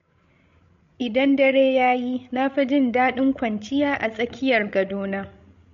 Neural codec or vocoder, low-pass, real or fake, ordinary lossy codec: codec, 16 kHz, 16 kbps, FreqCodec, larger model; 7.2 kHz; fake; MP3, 48 kbps